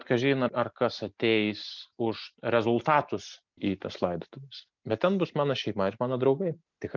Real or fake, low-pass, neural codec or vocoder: real; 7.2 kHz; none